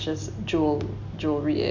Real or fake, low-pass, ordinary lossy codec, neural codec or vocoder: real; 7.2 kHz; none; none